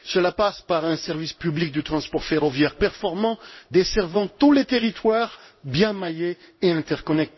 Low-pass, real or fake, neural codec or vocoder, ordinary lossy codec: 7.2 kHz; fake; codec, 16 kHz in and 24 kHz out, 1 kbps, XY-Tokenizer; MP3, 24 kbps